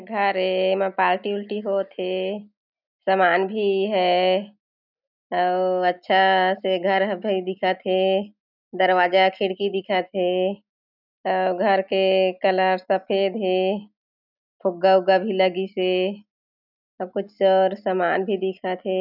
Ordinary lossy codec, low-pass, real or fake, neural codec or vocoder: none; 5.4 kHz; real; none